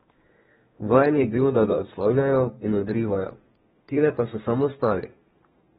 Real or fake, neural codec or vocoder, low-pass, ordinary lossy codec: fake; codec, 32 kHz, 1.9 kbps, SNAC; 14.4 kHz; AAC, 16 kbps